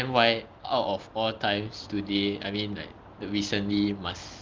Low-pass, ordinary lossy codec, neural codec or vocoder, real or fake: 7.2 kHz; Opus, 32 kbps; none; real